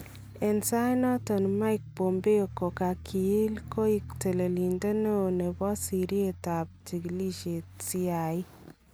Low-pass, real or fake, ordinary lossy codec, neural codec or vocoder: none; real; none; none